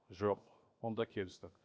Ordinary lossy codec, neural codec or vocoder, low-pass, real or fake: none; codec, 16 kHz, 0.7 kbps, FocalCodec; none; fake